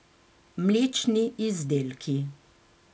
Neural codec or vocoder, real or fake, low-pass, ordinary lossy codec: none; real; none; none